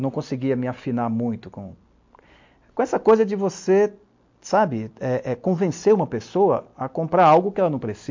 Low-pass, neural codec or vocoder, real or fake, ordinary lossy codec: 7.2 kHz; none; real; MP3, 48 kbps